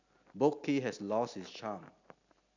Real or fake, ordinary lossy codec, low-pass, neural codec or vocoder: real; none; 7.2 kHz; none